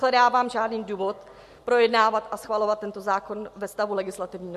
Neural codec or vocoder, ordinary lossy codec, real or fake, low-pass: none; MP3, 64 kbps; real; 14.4 kHz